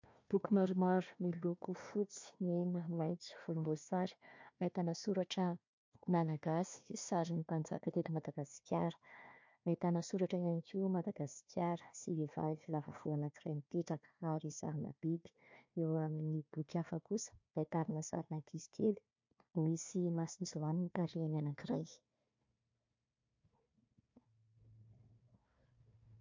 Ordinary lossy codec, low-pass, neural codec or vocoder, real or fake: MP3, 48 kbps; 7.2 kHz; codec, 16 kHz, 1 kbps, FunCodec, trained on Chinese and English, 50 frames a second; fake